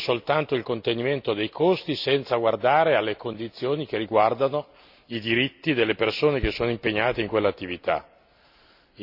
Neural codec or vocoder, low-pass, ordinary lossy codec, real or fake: none; 5.4 kHz; none; real